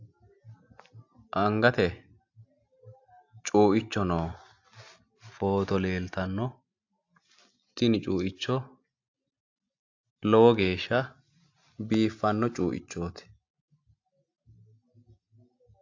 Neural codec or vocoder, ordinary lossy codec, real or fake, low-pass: none; AAC, 48 kbps; real; 7.2 kHz